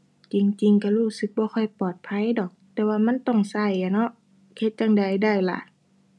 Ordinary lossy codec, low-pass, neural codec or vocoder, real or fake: none; none; none; real